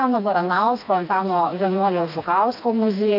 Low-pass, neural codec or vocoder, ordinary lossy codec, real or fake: 5.4 kHz; codec, 16 kHz, 2 kbps, FreqCodec, smaller model; AAC, 48 kbps; fake